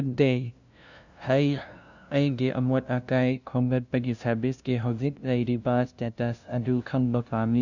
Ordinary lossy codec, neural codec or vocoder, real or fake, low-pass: none; codec, 16 kHz, 0.5 kbps, FunCodec, trained on LibriTTS, 25 frames a second; fake; 7.2 kHz